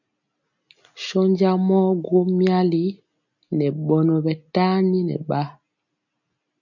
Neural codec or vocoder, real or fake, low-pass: none; real; 7.2 kHz